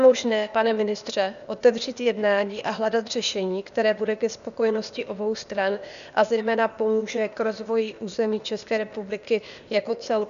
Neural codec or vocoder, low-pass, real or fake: codec, 16 kHz, 0.8 kbps, ZipCodec; 7.2 kHz; fake